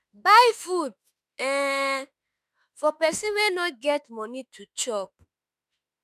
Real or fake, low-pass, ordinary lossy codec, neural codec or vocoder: fake; 14.4 kHz; none; autoencoder, 48 kHz, 32 numbers a frame, DAC-VAE, trained on Japanese speech